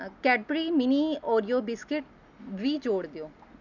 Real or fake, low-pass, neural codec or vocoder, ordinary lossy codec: real; 7.2 kHz; none; none